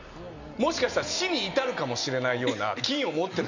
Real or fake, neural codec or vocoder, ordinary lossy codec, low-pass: real; none; none; 7.2 kHz